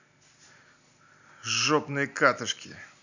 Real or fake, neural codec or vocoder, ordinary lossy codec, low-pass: real; none; none; 7.2 kHz